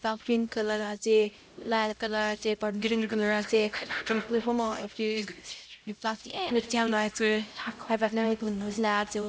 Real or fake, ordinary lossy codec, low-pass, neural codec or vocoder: fake; none; none; codec, 16 kHz, 0.5 kbps, X-Codec, HuBERT features, trained on LibriSpeech